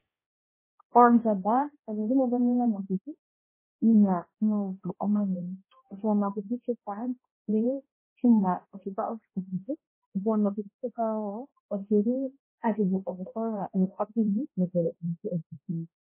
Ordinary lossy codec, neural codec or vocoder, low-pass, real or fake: MP3, 16 kbps; codec, 16 kHz, 0.5 kbps, X-Codec, HuBERT features, trained on balanced general audio; 3.6 kHz; fake